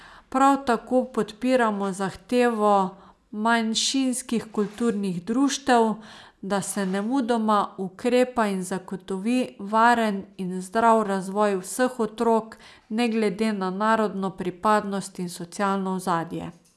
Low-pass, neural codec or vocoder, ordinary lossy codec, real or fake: none; none; none; real